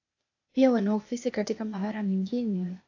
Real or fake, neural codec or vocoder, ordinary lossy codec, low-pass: fake; codec, 16 kHz, 0.8 kbps, ZipCodec; AAC, 48 kbps; 7.2 kHz